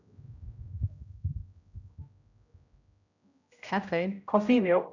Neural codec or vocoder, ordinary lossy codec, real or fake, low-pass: codec, 16 kHz, 0.5 kbps, X-Codec, HuBERT features, trained on general audio; none; fake; 7.2 kHz